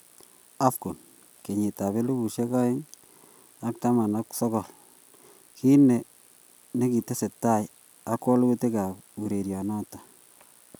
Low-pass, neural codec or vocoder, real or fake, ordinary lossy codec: none; none; real; none